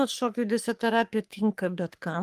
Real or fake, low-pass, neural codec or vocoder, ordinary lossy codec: fake; 14.4 kHz; codec, 44.1 kHz, 3.4 kbps, Pupu-Codec; Opus, 32 kbps